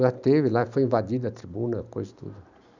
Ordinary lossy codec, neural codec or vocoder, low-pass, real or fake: none; none; 7.2 kHz; real